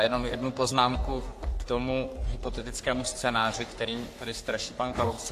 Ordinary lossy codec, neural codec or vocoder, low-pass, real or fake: AAC, 64 kbps; codec, 44.1 kHz, 3.4 kbps, Pupu-Codec; 14.4 kHz; fake